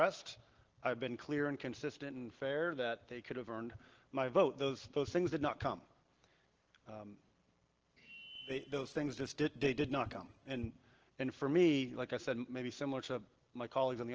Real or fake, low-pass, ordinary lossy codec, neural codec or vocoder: real; 7.2 kHz; Opus, 16 kbps; none